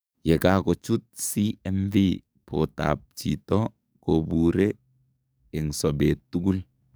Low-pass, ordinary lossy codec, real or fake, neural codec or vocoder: none; none; fake; codec, 44.1 kHz, 7.8 kbps, DAC